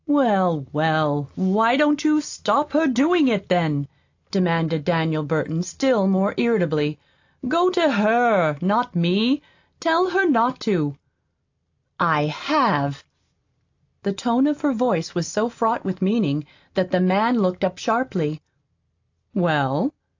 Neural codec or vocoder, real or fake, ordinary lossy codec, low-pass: none; real; AAC, 48 kbps; 7.2 kHz